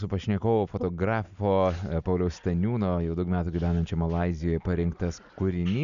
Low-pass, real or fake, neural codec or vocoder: 7.2 kHz; real; none